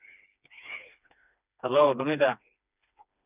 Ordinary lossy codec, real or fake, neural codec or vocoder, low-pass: none; fake; codec, 16 kHz, 2 kbps, FreqCodec, smaller model; 3.6 kHz